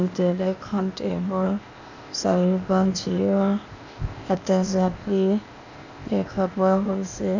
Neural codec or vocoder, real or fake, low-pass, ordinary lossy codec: codec, 16 kHz, 0.8 kbps, ZipCodec; fake; 7.2 kHz; none